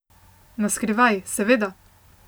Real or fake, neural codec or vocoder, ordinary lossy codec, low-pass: real; none; none; none